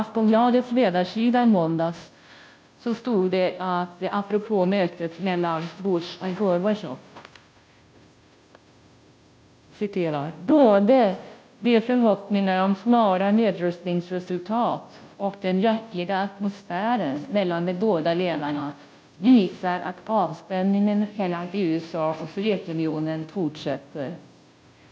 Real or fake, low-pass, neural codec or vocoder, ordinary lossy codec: fake; none; codec, 16 kHz, 0.5 kbps, FunCodec, trained on Chinese and English, 25 frames a second; none